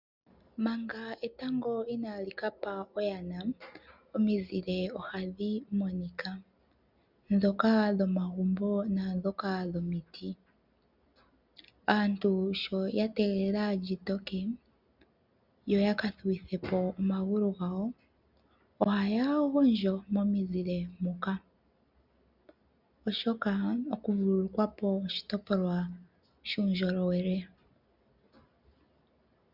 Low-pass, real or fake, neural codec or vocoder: 5.4 kHz; real; none